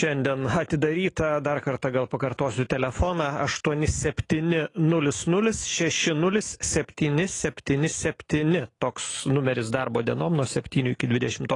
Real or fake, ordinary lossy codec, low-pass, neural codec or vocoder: fake; AAC, 32 kbps; 10.8 kHz; autoencoder, 48 kHz, 128 numbers a frame, DAC-VAE, trained on Japanese speech